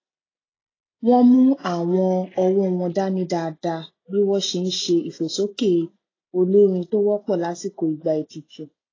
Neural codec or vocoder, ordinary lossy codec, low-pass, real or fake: none; AAC, 32 kbps; 7.2 kHz; real